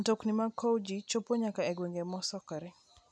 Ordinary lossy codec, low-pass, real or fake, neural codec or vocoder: none; none; real; none